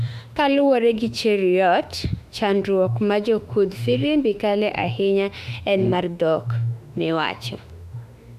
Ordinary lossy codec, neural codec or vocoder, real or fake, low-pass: none; autoencoder, 48 kHz, 32 numbers a frame, DAC-VAE, trained on Japanese speech; fake; 14.4 kHz